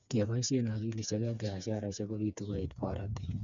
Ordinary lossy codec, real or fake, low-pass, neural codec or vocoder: none; fake; 7.2 kHz; codec, 16 kHz, 4 kbps, FreqCodec, smaller model